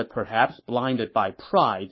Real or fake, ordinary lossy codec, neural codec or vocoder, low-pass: fake; MP3, 24 kbps; codec, 44.1 kHz, 7.8 kbps, Pupu-Codec; 7.2 kHz